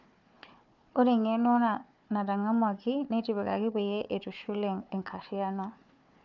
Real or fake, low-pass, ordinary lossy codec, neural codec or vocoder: fake; 7.2 kHz; none; codec, 16 kHz, 4 kbps, FunCodec, trained on Chinese and English, 50 frames a second